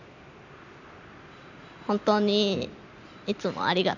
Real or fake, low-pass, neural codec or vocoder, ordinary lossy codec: real; 7.2 kHz; none; none